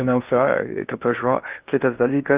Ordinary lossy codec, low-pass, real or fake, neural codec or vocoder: Opus, 32 kbps; 3.6 kHz; fake; codec, 16 kHz in and 24 kHz out, 0.6 kbps, FocalCodec, streaming, 4096 codes